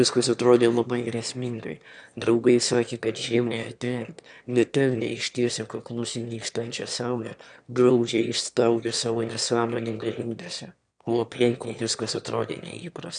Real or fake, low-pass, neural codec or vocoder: fake; 9.9 kHz; autoencoder, 22.05 kHz, a latent of 192 numbers a frame, VITS, trained on one speaker